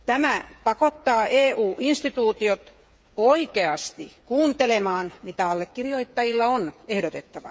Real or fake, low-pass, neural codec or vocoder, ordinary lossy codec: fake; none; codec, 16 kHz, 8 kbps, FreqCodec, smaller model; none